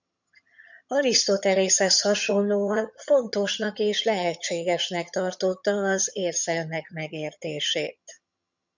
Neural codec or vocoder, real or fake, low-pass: vocoder, 22.05 kHz, 80 mel bands, HiFi-GAN; fake; 7.2 kHz